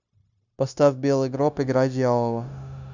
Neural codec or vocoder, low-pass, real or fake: codec, 16 kHz, 0.9 kbps, LongCat-Audio-Codec; 7.2 kHz; fake